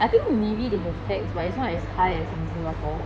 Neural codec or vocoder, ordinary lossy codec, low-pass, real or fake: codec, 16 kHz in and 24 kHz out, 2.2 kbps, FireRedTTS-2 codec; none; 9.9 kHz; fake